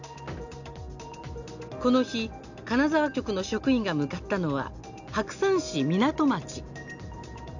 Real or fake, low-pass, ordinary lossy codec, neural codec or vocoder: real; 7.2 kHz; none; none